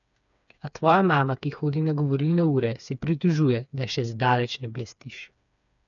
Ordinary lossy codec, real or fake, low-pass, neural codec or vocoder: none; fake; 7.2 kHz; codec, 16 kHz, 4 kbps, FreqCodec, smaller model